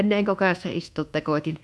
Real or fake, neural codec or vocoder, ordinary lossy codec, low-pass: fake; codec, 24 kHz, 1.2 kbps, DualCodec; none; none